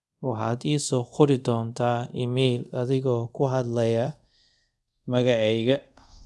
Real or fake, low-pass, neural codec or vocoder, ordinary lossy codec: fake; none; codec, 24 kHz, 0.5 kbps, DualCodec; none